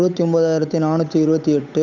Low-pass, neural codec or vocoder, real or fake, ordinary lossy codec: 7.2 kHz; codec, 16 kHz, 8 kbps, FunCodec, trained on Chinese and English, 25 frames a second; fake; none